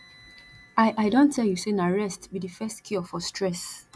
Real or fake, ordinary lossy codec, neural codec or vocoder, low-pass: real; none; none; none